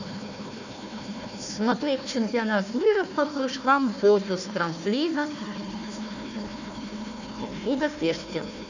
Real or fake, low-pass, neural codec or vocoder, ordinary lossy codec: fake; 7.2 kHz; codec, 16 kHz, 1 kbps, FunCodec, trained on Chinese and English, 50 frames a second; none